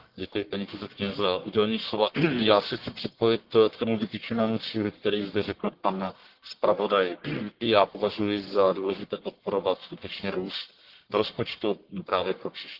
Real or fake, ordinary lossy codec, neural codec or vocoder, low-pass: fake; Opus, 16 kbps; codec, 44.1 kHz, 1.7 kbps, Pupu-Codec; 5.4 kHz